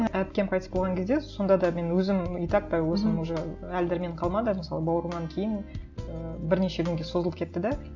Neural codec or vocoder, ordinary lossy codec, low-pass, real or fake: none; none; 7.2 kHz; real